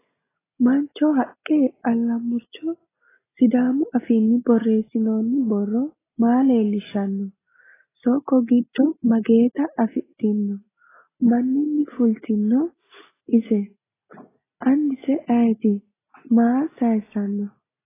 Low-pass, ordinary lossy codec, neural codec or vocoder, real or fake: 3.6 kHz; AAC, 16 kbps; none; real